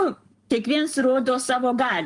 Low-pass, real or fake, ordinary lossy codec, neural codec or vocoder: 10.8 kHz; fake; Opus, 32 kbps; codec, 44.1 kHz, 7.8 kbps, Pupu-Codec